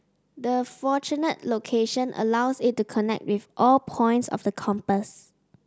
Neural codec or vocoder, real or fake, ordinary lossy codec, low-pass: none; real; none; none